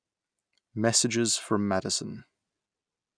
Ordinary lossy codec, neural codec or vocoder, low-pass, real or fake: none; none; 9.9 kHz; real